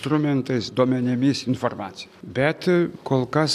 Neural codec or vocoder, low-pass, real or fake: none; 14.4 kHz; real